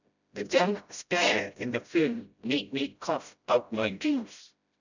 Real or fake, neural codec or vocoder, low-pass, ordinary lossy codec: fake; codec, 16 kHz, 0.5 kbps, FreqCodec, smaller model; 7.2 kHz; none